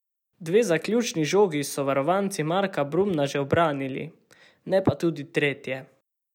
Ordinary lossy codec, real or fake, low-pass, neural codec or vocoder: none; real; 19.8 kHz; none